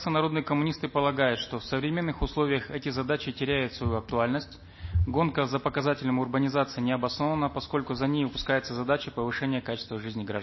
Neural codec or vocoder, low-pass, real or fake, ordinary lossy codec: none; 7.2 kHz; real; MP3, 24 kbps